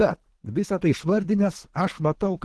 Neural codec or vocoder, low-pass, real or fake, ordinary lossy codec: codec, 24 kHz, 3 kbps, HILCodec; 10.8 kHz; fake; Opus, 16 kbps